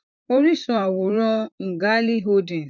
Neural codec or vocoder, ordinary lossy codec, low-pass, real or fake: vocoder, 44.1 kHz, 128 mel bands, Pupu-Vocoder; none; 7.2 kHz; fake